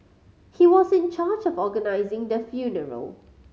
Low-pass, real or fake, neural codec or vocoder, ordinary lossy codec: none; real; none; none